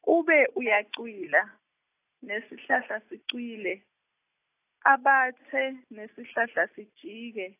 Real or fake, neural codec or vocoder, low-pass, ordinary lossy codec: real; none; 3.6 kHz; AAC, 24 kbps